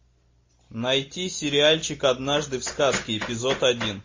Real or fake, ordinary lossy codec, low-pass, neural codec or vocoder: real; MP3, 32 kbps; 7.2 kHz; none